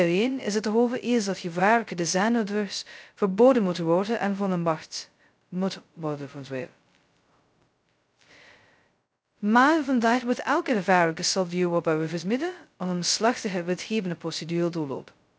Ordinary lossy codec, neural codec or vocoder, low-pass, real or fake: none; codec, 16 kHz, 0.2 kbps, FocalCodec; none; fake